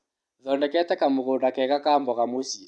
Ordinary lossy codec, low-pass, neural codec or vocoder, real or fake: none; none; none; real